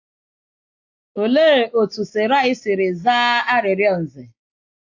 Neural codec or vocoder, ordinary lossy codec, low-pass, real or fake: none; AAC, 48 kbps; 7.2 kHz; real